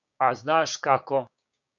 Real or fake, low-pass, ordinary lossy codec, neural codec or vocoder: fake; 7.2 kHz; MP3, 64 kbps; codec, 16 kHz, 6 kbps, DAC